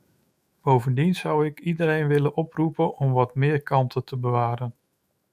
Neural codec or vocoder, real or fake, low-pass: autoencoder, 48 kHz, 128 numbers a frame, DAC-VAE, trained on Japanese speech; fake; 14.4 kHz